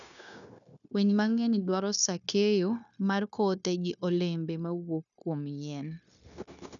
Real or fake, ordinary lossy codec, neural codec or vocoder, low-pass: fake; none; codec, 16 kHz, 0.9 kbps, LongCat-Audio-Codec; 7.2 kHz